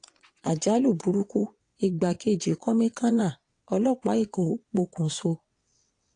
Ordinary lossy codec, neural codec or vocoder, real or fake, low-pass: AAC, 48 kbps; vocoder, 22.05 kHz, 80 mel bands, WaveNeXt; fake; 9.9 kHz